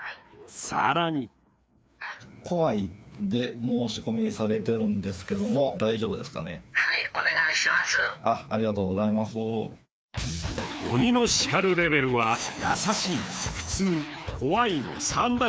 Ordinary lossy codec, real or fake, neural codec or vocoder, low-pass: none; fake; codec, 16 kHz, 2 kbps, FreqCodec, larger model; none